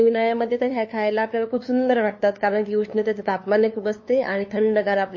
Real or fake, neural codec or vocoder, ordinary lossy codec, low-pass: fake; codec, 16 kHz, 2 kbps, FunCodec, trained on LibriTTS, 25 frames a second; MP3, 32 kbps; 7.2 kHz